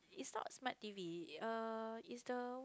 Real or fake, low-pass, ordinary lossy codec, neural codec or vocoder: real; none; none; none